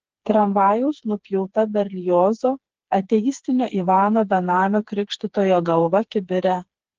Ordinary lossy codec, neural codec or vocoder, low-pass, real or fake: Opus, 16 kbps; codec, 16 kHz, 4 kbps, FreqCodec, smaller model; 7.2 kHz; fake